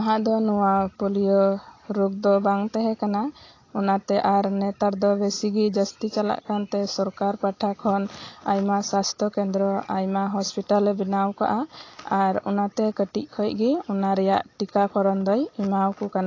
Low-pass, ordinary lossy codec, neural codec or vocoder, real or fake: 7.2 kHz; AAC, 32 kbps; none; real